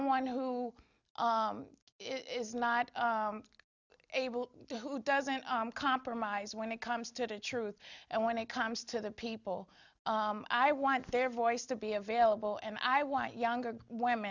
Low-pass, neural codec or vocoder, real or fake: 7.2 kHz; none; real